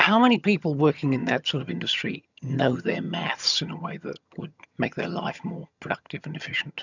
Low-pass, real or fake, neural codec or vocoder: 7.2 kHz; fake; vocoder, 22.05 kHz, 80 mel bands, HiFi-GAN